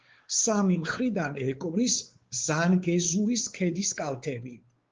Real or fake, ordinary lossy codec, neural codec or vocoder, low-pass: fake; Opus, 16 kbps; codec, 16 kHz, 4 kbps, X-Codec, WavLM features, trained on Multilingual LibriSpeech; 7.2 kHz